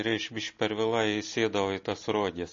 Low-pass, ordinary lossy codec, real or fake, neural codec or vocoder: 7.2 kHz; MP3, 32 kbps; real; none